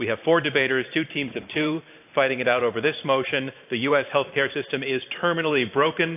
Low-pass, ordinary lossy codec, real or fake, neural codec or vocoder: 3.6 kHz; AAC, 32 kbps; fake; vocoder, 44.1 kHz, 128 mel bands every 512 samples, BigVGAN v2